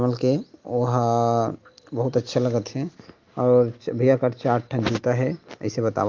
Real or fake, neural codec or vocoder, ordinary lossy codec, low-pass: real; none; Opus, 32 kbps; 7.2 kHz